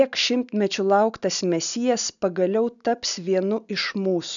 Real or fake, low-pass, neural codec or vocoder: real; 7.2 kHz; none